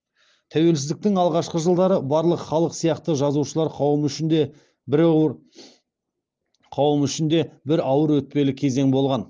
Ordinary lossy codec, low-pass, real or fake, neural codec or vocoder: Opus, 24 kbps; 7.2 kHz; real; none